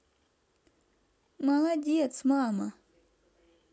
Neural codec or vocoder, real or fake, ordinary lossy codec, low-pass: none; real; none; none